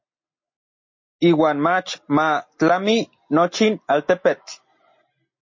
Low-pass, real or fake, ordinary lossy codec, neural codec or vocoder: 7.2 kHz; real; MP3, 32 kbps; none